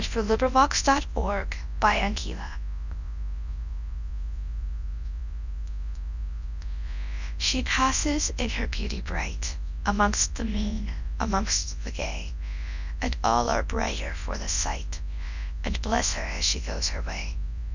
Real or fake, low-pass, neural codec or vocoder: fake; 7.2 kHz; codec, 24 kHz, 0.9 kbps, WavTokenizer, large speech release